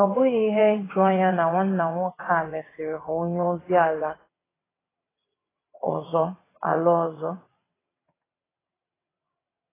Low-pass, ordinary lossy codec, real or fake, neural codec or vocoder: 3.6 kHz; AAC, 16 kbps; fake; vocoder, 22.05 kHz, 80 mel bands, WaveNeXt